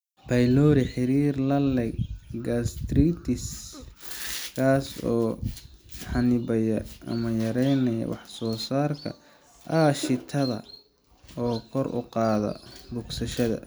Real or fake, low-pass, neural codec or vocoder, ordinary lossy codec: real; none; none; none